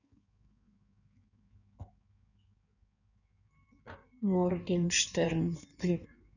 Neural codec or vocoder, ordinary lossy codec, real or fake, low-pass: codec, 16 kHz in and 24 kHz out, 1.1 kbps, FireRedTTS-2 codec; none; fake; 7.2 kHz